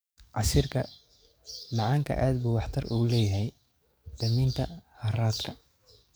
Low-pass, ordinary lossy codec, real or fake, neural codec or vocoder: none; none; fake; codec, 44.1 kHz, 7.8 kbps, DAC